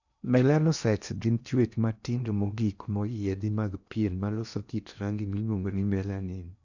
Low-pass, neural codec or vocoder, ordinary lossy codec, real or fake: 7.2 kHz; codec, 16 kHz in and 24 kHz out, 0.8 kbps, FocalCodec, streaming, 65536 codes; none; fake